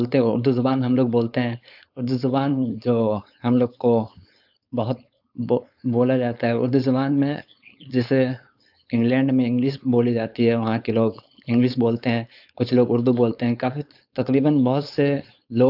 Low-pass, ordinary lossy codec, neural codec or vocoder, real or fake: 5.4 kHz; AAC, 48 kbps; codec, 16 kHz, 4.8 kbps, FACodec; fake